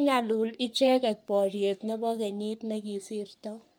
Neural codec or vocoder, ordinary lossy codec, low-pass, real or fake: codec, 44.1 kHz, 3.4 kbps, Pupu-Codec; none; none; fake